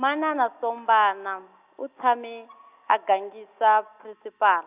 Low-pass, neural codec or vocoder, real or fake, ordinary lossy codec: 3.6 kHz; none; real; Opus, 64 kbps